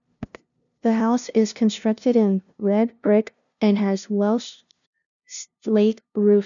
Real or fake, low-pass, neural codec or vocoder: fake; 7.2 kHz; codec, 16 kHz, 0.5 kbps, FunCodec, trained on LibriTTS, 25 frames a second